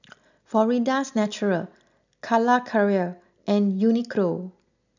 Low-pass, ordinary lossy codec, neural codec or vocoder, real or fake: 7.2 kHz; none; none; real